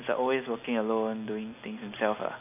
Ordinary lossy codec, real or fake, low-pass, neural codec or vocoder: none; real; 3.6 kHz; none